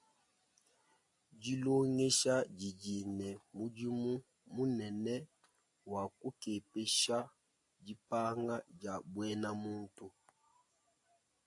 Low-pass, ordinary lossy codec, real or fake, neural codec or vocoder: 10.8 kHz; AAC, 64 kbps; real; none